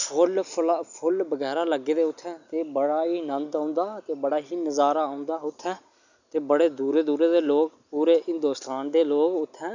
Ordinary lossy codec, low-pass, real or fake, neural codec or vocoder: none; 7.2 kHz; real; none